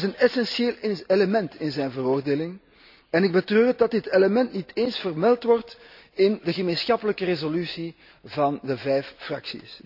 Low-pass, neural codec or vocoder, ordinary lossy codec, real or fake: 5.4 kHz; none; none; real